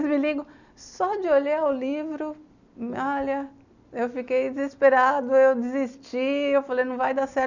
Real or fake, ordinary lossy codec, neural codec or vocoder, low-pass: real; none; none; 7.2 kHz